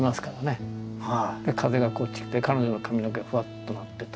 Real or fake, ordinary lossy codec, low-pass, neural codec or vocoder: real; none; none; none